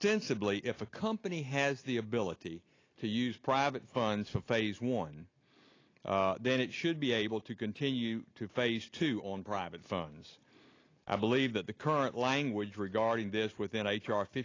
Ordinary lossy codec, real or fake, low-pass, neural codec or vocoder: AAC, 32 kbps; real; 7.2 kHz; none